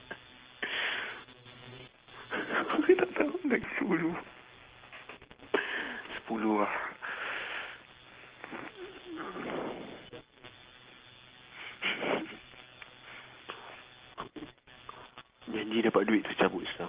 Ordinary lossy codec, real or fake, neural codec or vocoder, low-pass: Opus, 16 kbps; real; none; 3.6 kHz